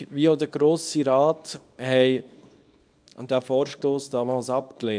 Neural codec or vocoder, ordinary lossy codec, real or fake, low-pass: codec, 24 kHz, 0.9 kbps, WavTokenizer, small release; none; fake; 9.9 kHz